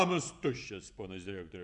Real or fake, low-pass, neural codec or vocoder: fake; 10.8 kHz; vocoder, 24 kHz, 100 mel bands, Vocos